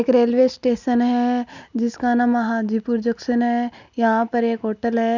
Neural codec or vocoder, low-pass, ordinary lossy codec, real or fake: none; 7.2 kHz; none; real